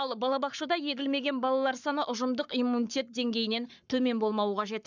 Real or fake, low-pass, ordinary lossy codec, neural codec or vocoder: fake; 7.2 kHz; none; codec, 44.1 kHz, 7.8 kbps, Pupu-Codec